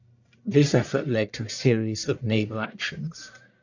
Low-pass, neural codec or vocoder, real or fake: 7.2 kHz; codec, 44.1 kHz, 1.7 kbps, Pupu-Codec; fake